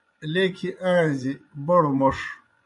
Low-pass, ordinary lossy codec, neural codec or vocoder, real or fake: 10.8 kHz; AAC, 64 kbps; vocoder, 24 kHz, 100 mel bands, Vocos; fake